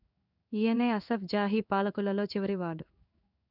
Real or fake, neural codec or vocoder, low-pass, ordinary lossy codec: fake; codec, 16 kHz in and 24 kHz out, 1 kbps, XY-Tokenizer; 5.4 kHz; none